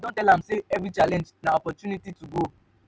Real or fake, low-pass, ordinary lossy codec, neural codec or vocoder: real; none; none; none